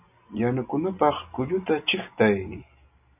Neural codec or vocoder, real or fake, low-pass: none; real; 3.6 kHz